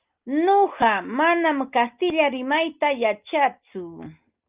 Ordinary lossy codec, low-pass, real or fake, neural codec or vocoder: Opus, 32 kbps; 3.6 kHz; real; none